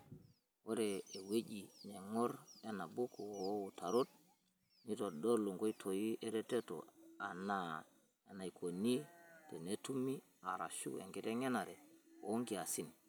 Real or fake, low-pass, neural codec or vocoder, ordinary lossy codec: real; none; none; none